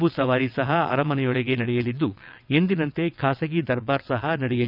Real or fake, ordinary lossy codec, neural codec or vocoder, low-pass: fake; none; vocoder, 22.05 kHz, 80 mel bands, WaveNeXt; 5.4 kHz